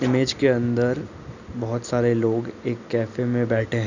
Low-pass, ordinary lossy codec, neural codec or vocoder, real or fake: 7.2 kHz; none; none; real